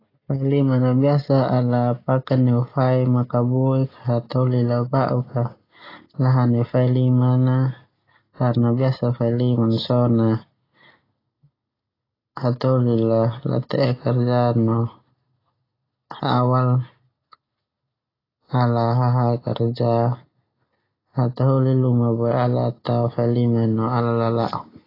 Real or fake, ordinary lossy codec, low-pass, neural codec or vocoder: real; AAC, 24 kbps; 5.4 kHz; none